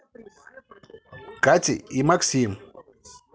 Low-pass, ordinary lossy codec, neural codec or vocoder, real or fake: none; none; none; real